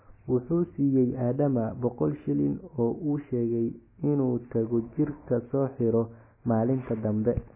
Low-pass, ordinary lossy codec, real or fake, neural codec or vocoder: 3.6 kHz; MP3, 16 kbps; real; none